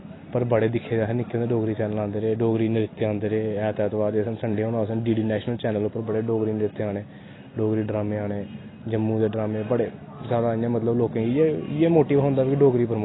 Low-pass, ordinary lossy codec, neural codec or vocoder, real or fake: 7.2 kHz; AAC, 16 kbps; none; real